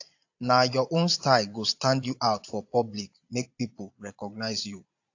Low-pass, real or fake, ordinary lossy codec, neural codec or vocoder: 7.2 kHz; fake; AAC, 48 kbps; vocoder, 22.05 kHz, 80 mel bands, Vocos